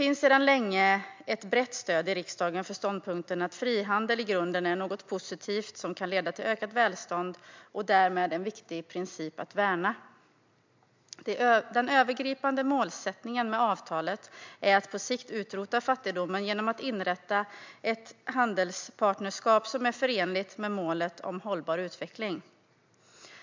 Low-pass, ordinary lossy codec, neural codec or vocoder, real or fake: 7.2 kHz; MP3, 64 kbps; none; real